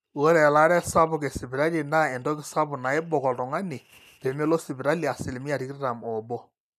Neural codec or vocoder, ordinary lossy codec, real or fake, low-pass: none; none; real; 14.4 kHz